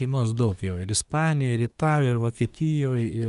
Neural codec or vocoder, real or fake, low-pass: codec, 24 kHz, 1 kbps, SNAC; fake; 10.8 kHz